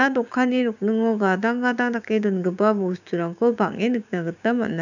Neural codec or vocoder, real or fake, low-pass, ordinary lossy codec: codec, 16 kHz, 6 kbps, DAC; fake; 7.2 kHz; none